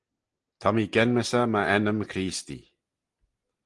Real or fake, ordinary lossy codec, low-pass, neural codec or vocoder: real; Opus, 24 kbps; 10.8 kHz; none